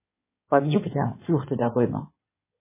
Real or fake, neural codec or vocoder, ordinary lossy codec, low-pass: fake; codec, 16 kHz in and 24 kHz out, 2.2 kbps, FireRedTTS-2 codec; MP3, 16 kbps; 3.6 kHz